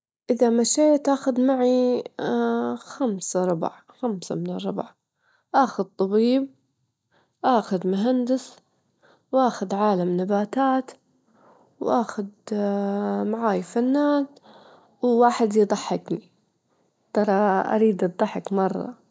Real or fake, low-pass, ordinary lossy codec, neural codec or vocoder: real; none; none; none